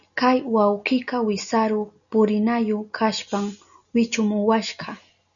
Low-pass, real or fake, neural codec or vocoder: 7.2 kHz; real; none